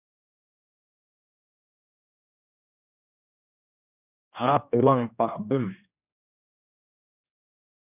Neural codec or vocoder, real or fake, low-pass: codec, 16 kHz, 1 kbps, X-Codec, HuBERT features, trained on general audio; fake; 3.6 kHz